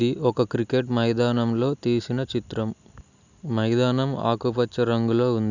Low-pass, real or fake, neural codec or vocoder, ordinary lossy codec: 7.2 kHz; real; none; none